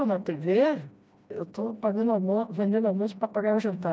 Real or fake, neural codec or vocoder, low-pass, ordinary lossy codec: fake; codec, 16 kHz, 1 kbps, FreqCodec, smaller model; none; none